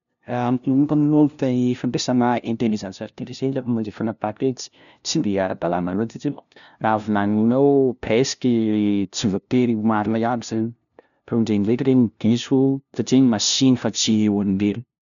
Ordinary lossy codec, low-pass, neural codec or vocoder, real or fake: none; 7.2 kHz; codec, 16 kHz, 0.5 kbps, FunCodec, trained on LibriTTS, 25 frames a second; fake